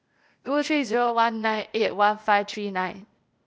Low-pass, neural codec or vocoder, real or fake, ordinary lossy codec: none; codec, 16 kHz, 0.8 kbps, ZipCodec; fake; none